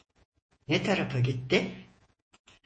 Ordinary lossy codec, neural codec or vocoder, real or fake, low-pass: MP3, 32 kbps; vocoder, 48 kHz, 128 mel bands, Vocos; fake; 9.9 kHz